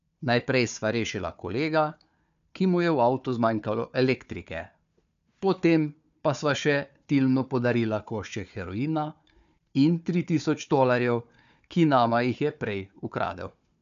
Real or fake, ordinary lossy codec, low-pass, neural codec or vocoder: fake; AAC, 96 kbps; 7.2 kHz; codec, 16 kHz, 4 kbps, FunCodec, trained on Chinese and English, 50 frames a second